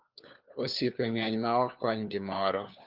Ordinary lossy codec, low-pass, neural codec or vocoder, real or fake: Opus, 24 kbps; 5.4 kHz; codec, 16 kHz, 4 kbps, FunCodec, trained on LibriTTS, 50 frames a second; fake